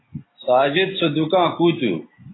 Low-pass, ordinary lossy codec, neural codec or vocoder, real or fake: 7.2 kHz; AAC, 16 kbps; none; real